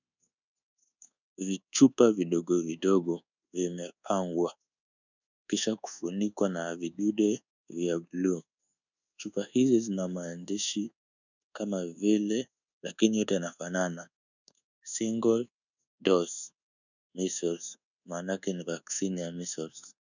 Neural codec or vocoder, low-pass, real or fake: codec, 24 kHz, 1.2 kbps, DualCodec; 7.2 kHz; fake